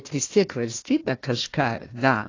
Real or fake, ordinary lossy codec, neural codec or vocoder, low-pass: fake; AAC, 32 kbps; codec, 16 kHz, 1 kbps, FunCodec, trained on Chinese and English, 50 frames a second; 7.2 kHz